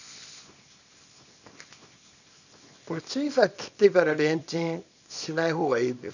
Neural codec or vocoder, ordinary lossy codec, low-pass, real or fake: codec, 24 kHz, 0.9 kbps, WavTokenizer, small release; none; 7.2 kHz; fake